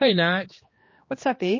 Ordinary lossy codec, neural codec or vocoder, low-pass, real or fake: MP3, 32 kbps; codec, 16 kHz, 2 kbps, X-Codec, HuBERT features, trained on general audio; 7.2 kHz; fake